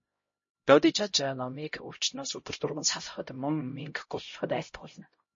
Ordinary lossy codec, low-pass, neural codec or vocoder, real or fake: MP3, 32 kbps; 7.2 kHz; codec, 16 kHz, 0.5 kbps, X-Codec, HuBERT features, trained on LibriSpeech; fake